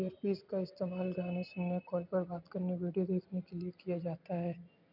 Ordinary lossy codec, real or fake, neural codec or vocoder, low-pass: none; real; none; 5.4 kHz